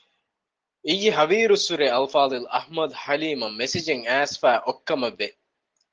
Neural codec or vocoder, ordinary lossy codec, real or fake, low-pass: none; Opus, 16 kbps; real; 7.2 kHz